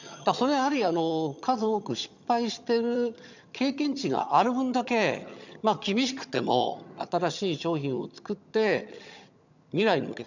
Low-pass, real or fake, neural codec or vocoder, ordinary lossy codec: 7.2 kHz; fake; vocoder, 22.05 kHz, 80 mel bands, HiFi-GAN; none